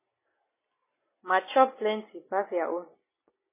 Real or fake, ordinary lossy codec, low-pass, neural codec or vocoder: real; MP3, 16 kbps; 3.6 kHz; none